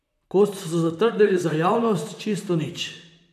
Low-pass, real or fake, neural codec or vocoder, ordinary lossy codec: 14.4 kHz; fake; vocoder, 44.1 kHz, 128 mel bands, Pupu-Vocoder; none